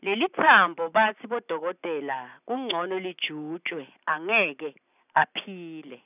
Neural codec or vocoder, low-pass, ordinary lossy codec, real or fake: none; 3.6 kHz; none; real